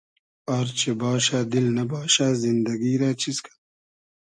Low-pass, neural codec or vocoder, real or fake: 9.9 kHz; none; real